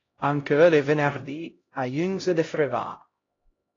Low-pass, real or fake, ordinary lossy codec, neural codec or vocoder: 7.2 kHz; fake; AAC, 32 kbps; codec, 16 kHz, 0.5 kbps, X-Codec, HuBERT features, trained on LibriSpeech